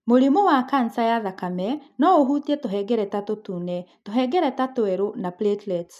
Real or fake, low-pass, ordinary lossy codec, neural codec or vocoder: real; 14.4 kHz; none; none